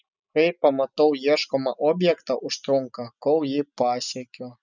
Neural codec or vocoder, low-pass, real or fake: none; 7.2 kHz; real